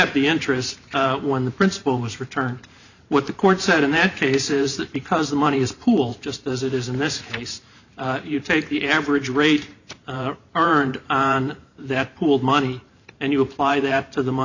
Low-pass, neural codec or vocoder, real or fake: 7.2 kHz; vocoder, 44.1 kHz, 128 mel bands every 512 samples, BigVGAN v2; fake